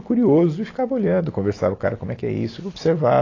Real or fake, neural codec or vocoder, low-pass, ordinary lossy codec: real; none; 7.2 kHz; AAC, 32 kbps